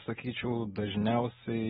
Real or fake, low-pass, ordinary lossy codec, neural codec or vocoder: real; 19.8 kHz; AAC, 16 kbps; none